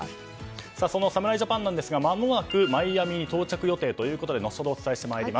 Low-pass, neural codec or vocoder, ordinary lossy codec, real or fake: none; none; none; real